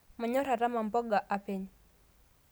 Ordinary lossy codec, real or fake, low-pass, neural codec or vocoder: none; real; none; none